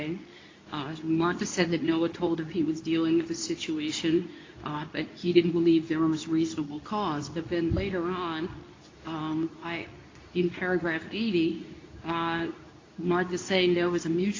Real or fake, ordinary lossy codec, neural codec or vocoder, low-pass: fake; AAC, 32 kbps; codec, 24 kHz, 0.9 kbps, WavTokenizer, medium speech release version 2; 7.2 kHz